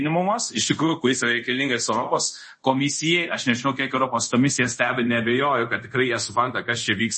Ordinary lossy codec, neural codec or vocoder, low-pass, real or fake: MP3, 32 kbps; codec, 24 kHz, 0.5 kbps, DualCodec; 10.8 kHz; fake